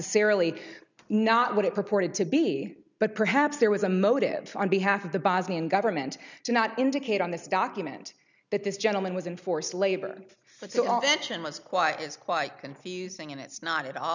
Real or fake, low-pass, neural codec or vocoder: real; 7.2 kHz; none